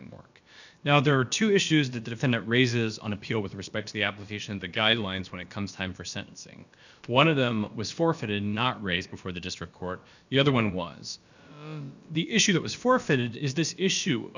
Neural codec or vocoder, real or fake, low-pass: codec, 16 kHz, about 1 kbps, DyCAST, with the encoder's durations; fake; 7.2 kHz